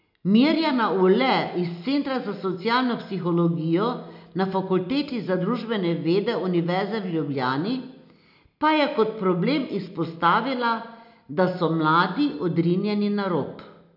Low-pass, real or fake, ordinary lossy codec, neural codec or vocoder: 5.4 kHz; real; none; none